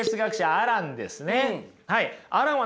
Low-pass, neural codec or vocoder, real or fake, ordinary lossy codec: none; none; real; none